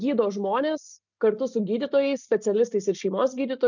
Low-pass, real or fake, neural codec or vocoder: 7.2 kHz; real; none